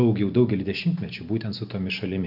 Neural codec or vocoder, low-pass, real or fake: none; 5.4 kHz; real